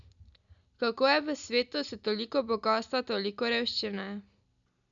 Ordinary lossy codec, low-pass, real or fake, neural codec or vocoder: none; 7.2 kHz; real; none